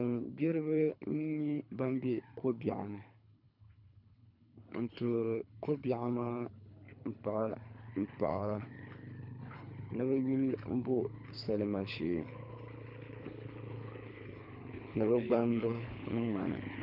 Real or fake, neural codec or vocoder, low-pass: fake; codec, 24 kHz, 3 kbps, HILCodec; 5.4 kHz